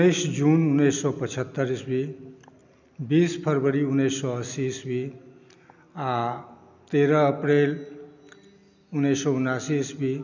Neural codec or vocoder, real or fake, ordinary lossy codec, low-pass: none; real; none; 7.2 kHz